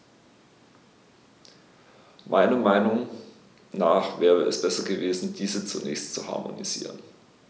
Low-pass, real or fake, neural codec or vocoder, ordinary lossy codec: none; real; none; none